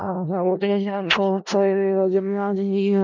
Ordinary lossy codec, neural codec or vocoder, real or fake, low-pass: none; codec, 16 kHz in and 24 kHz out, 0.4 kbps, LongCat-Audio-Codec, four codebook decoder; fake; 7.2 kHz